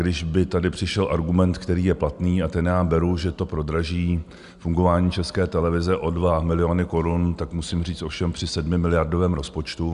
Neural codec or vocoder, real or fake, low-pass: none; real; 10.8 kHz